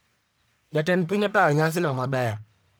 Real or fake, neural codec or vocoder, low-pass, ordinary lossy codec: fake; codec, 44.1 kHz, 1.7 kbps, Pupu-Codec; none; none